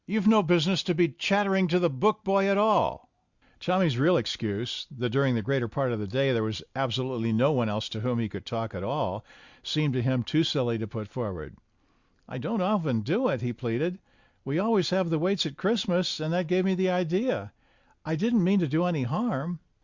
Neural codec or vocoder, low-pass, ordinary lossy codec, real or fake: none; 7.2 kHz; Opus, 64 kbps; real